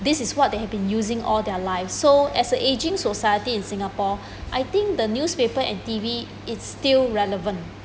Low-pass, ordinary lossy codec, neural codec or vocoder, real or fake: none; none; none; real